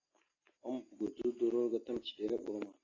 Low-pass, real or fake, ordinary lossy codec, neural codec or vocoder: 7.2 kHz; real; AAC, 32 kbps; none